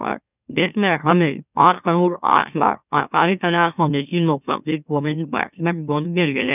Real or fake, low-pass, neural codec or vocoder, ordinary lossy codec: fake; 3.6 kHz; autoencoder, 44.1 kHz, a latent of 192 numbers a frame, MeloTTS; none